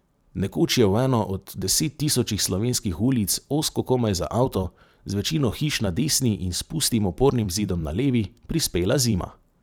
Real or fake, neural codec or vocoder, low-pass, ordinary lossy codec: fake; vocoder, 44.1 kHz, 128 mel bands every 512 samples, BigVGAN v2; none; none